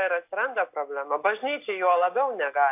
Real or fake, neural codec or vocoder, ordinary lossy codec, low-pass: real; none; AAC, 32 kbps; 3.6 kHz